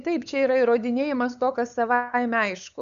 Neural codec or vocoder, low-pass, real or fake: codec, 16 kHz, 8 kbps, FunCodec, trained on LibriTTS, 25 frames a second; 7.2 kHz; fake